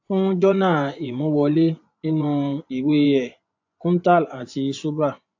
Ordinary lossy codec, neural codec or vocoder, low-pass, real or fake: AAC, 48 kbps; vocoder, 24 kHz, 100 mel bands, Vocos; 7.2 kHz; fake